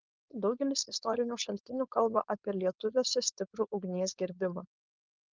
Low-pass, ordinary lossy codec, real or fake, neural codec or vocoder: 7.2 kHz; Opus, 24 kbps; fake; codec, 16 kHz, 4.8 kbps, FACodec